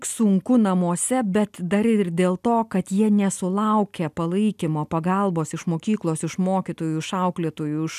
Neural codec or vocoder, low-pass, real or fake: none; 14.4 kHz; real